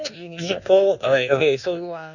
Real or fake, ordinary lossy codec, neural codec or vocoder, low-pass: fake; none; codec, 24 kHz, 1.2 kbps, DualCodec; 7.2 kHz